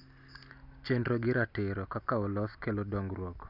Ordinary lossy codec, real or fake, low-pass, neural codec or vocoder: none; real; 5.4 kHz; none